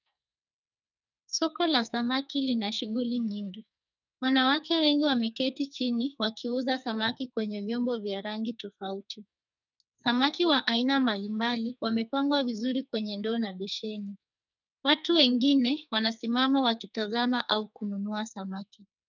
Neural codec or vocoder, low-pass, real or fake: codec, 44.1 kHz, 2.6 kbps, SNAC; 7.2 kHz; fake